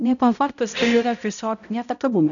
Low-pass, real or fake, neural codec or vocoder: 7.2 kHz; fake; codec, 16 kHz, 0.5 kbps, X-Codec, HuBERT features, trained on balanced general audio